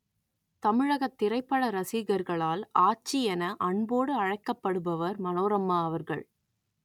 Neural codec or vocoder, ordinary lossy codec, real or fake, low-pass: none; none; real; 19.8 kHz